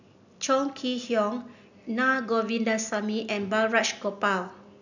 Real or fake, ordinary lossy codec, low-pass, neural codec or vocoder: real; none; 7.2 kHz; none